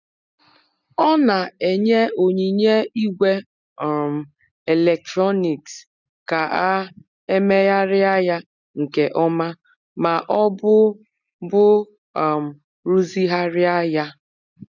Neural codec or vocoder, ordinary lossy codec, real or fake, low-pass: none; none; real; 7.2 kHz